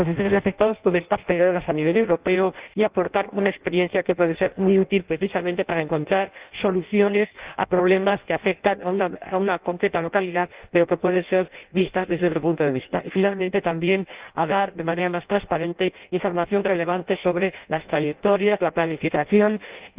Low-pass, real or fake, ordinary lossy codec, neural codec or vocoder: 3.6 kHz; fake; Opus, 32 kbps; codec, 16 kHz in and 24 kHz out, 0.6 kbps, FireRedTTS-2 codec